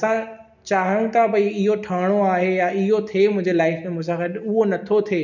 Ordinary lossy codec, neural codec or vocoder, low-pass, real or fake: none; none; 7.2 kHz; real